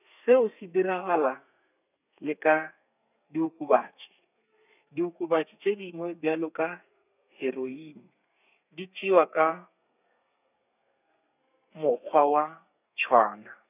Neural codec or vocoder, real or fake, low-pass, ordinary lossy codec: codec, 32 kHz, 1.9 kbps, SNAC; fake; 3.6 kHz; none